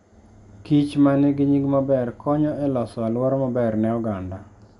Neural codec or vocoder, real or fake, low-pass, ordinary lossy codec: none; real; 10.8 kHz; none